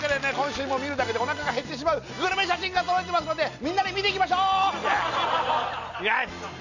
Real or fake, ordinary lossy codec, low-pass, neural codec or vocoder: real; none; 7.2 kHz; none